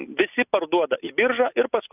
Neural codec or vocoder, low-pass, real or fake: none; 3.6 kHz; real